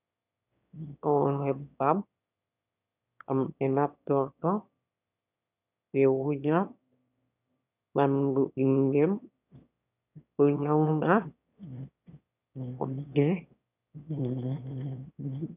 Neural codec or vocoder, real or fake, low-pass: autoencoder, 22.05 kHz, a latent of 192 numbers a frame, VITS, trained on one speaker; fake; 3.6 kHz